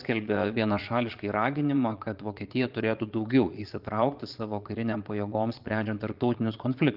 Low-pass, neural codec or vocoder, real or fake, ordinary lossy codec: 5.4 kHz; vocoder, 22.05 kHz, 80 mel bands, Vocos; fake; Opus, 32 kbps